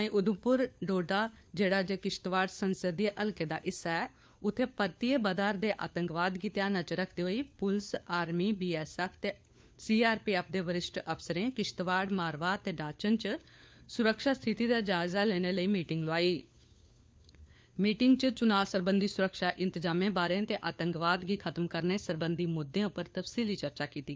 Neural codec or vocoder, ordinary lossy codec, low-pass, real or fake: codec, 16 kHz, 4 kbps, FunCodec, trained on LibriTTS, 50 frames a second; none; none; fake